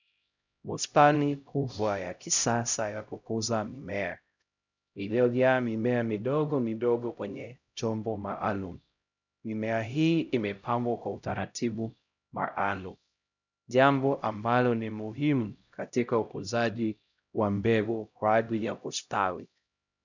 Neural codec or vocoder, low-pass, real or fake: codec, 16 kHz, 0.5 kbps, X-Codec, HuBERT features, trained on LibriSpeech; 7.2 kHz; fake